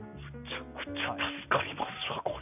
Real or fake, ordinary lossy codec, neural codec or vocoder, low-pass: real; none; none; 3.6 kHz